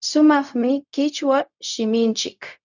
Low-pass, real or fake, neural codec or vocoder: 7.2 kHz; fake; codec, 16 kHz, 0.4 kbps, LongCat-Audio-Codec